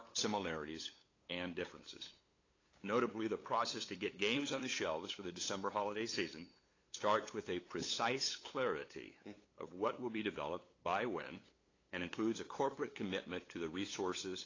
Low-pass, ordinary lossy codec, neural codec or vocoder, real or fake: 7.2 kHz; AAC, 32 kbps; codec, 16 kHz, 8 kbps, FunCodec, trained on LibriTTS, 25 frames a second; fake